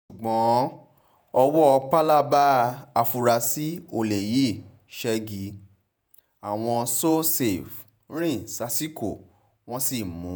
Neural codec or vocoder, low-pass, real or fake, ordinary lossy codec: vocoder, 48 kHz, 128 mel bands, Vocos; none; fake; none